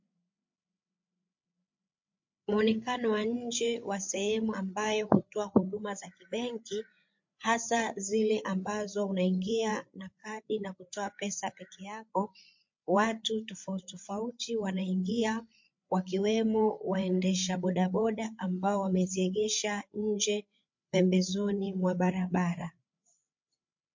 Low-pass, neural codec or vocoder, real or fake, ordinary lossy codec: 7.2 kHz; codec, 16 kHz, 8 kbps, FreqCodec, larger model; fake; MP3, 48 kbps